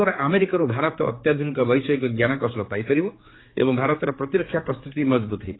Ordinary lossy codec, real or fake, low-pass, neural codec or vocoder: AAC, 16 kbps; fake; 7.2 kHz; codec, 16 kHz, 4 kbps, X-Codec, HuBERT features, trained on general audio